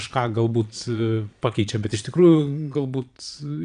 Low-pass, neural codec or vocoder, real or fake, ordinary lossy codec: 9.9 kHz; vocoder, 22.05 kHz, 80 mel bands, Vocos; fake; AAC, 96 kbps